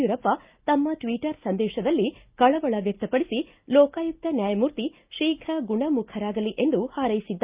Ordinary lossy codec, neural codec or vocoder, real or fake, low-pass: Opus, 32 kbps; none; real; 3.6 kHz